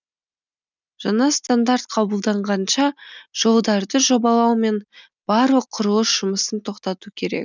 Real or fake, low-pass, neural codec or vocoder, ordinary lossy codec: real; 7.2 kHz; none; none